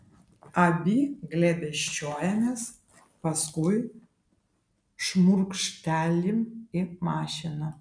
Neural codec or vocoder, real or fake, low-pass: none; real; 9.9 kHz